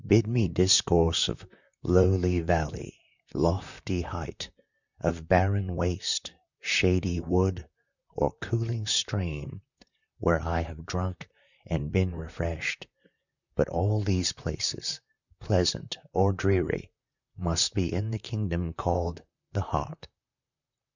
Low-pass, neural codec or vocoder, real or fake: 7.2 kHz; vocoder, 44.1 kHz, 128 mel bands, Pupu-Vocoder; fake